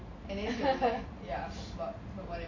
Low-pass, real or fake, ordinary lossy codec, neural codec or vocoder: 7.2 kHz; real; none; none